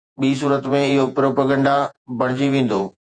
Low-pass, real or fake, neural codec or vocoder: 9.9 kHz; fake; vocoder, 48 kHz, 128 mel bands, Vocos